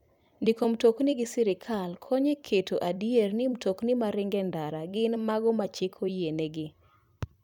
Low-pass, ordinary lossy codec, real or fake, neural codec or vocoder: 19.8 kHz; none; fake; vocoder, 44.1 kHz, 128 mel bands every 256 samples, BigVGAN v2